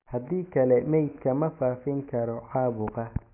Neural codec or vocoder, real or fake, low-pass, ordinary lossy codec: none; real; 3.6 kHz; none